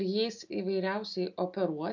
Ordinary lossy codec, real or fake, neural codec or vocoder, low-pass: AAC, 48 kbps; real; none; 7.2 kHz